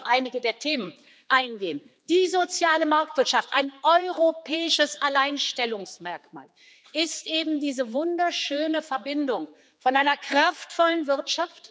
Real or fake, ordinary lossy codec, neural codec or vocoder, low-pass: fake; none; codec, 16 kHz, 4 kbps, X-Codec, HuBERT features, trained on general audio; none